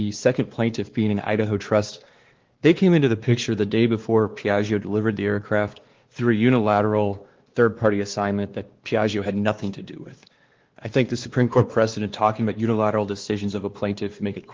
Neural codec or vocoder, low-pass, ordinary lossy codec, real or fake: codec, 16 kHz, 2 kbps, X-Codec, WavLM features, trained on Multilingual LibriSpeech; 7.2 kHz; Opus, 16 kbps; fake